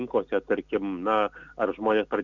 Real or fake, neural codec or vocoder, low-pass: real; none; 7.2 kHz